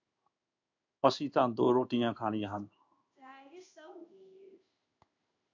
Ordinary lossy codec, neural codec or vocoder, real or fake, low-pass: AAC, 48 kbps; codec, 16 kHz in and 24 kHz out, 1 kbps, XY-Tokenizer; fake; 7.2 kHz